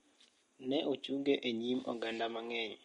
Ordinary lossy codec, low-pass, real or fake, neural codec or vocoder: MP3, 48 kbps; 19.8 kHz; real; none